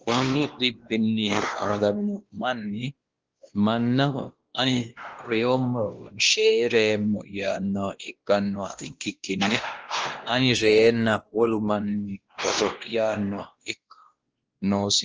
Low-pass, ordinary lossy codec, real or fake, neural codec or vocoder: 7.2 kHz; Opus, 16 kbps; fake; codec, 16 kHz, 1 kbps, X-Codec, WavLM features, trained on Multilingual LibriSpeech